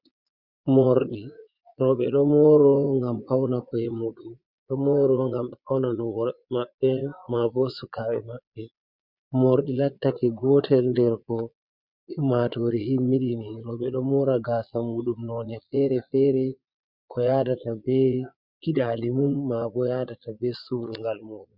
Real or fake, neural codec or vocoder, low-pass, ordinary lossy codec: fake; vocoder, 22.05 kHz, 80 mel bands, Vocos; 5.4 kHz; Opus, 64 kbps